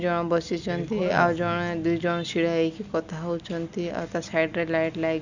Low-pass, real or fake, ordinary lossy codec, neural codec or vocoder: 7.2 kHz; real; Opus, 64 kbps; none